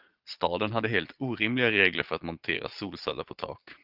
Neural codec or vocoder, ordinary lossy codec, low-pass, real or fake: vocoder, 22.05 kHz, 80 mel bands, Vocos; Opus, 24 kbps; 5.4 kHz; fake